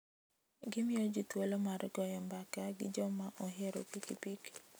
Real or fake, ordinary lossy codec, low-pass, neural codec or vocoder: real; none; none; none